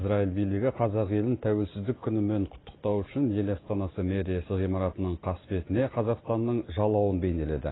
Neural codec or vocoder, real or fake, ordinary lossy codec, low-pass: none; real; AAC, 16 kbps; 7.2 kHz